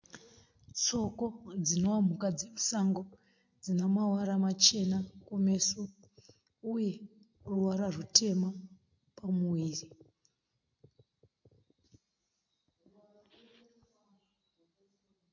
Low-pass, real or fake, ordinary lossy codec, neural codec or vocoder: 7.2 kHz; real; MP3, 48 kbps; none